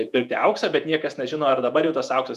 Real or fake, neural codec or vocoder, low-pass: fake; vocoder, 48 kHz, 128 mel bands, Vocos; 14.4 kHz